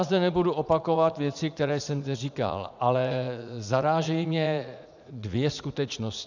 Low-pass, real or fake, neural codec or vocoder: 7.2 kHz; fake; vocoder, 44.1 kHz, 80 mel bands, Vocos